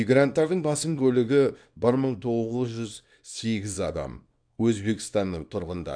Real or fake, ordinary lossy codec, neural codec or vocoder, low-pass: fake; none; codec, 24 kHz, 0.9 kbps, WavTokenizer, small release; 9.9 kHz